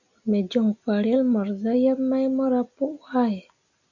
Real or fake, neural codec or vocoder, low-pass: real; none; 7.2 kHz